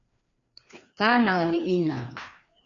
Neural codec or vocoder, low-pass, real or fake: codec, 16 kHz, 2 kbps, FreqCodec, larger model; 7.2 kHz; fake